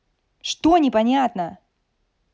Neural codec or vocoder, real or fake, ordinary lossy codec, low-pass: none; real; none; none